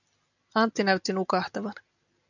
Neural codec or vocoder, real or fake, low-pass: none; real; 7.2 kHz